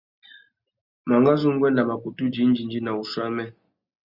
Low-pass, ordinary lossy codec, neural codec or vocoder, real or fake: 5.4 kHz; Opus, 64 kbps; none; real